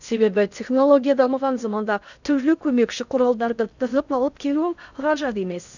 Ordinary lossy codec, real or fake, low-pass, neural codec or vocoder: none; fake; 7.2 kHz; codec, 16 kHz in and 24 kHz out, 0.6 kbps, FocalCodec, streaming, 2048 codes